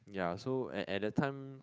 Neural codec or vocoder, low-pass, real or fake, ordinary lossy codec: codec, 16 kHz, 8 kbps, FunCodec, trained on Chinese and English, 25 frames a second; none; fake; none